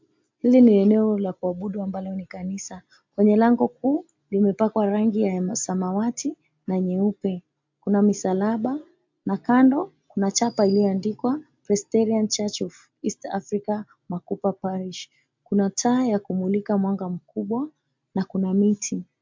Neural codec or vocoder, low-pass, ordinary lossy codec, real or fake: none; 7.2 kHz; MP3, 64 kbps; real